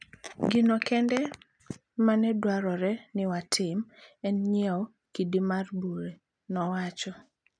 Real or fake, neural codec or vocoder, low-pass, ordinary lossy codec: real; none; 9.9 kHz; none